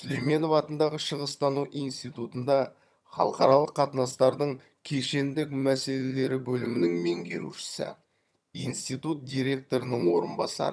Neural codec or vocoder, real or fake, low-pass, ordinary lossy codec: vocoder, 22.05 kHz, 80 mel bands, HiFi-GAN; fake; none; none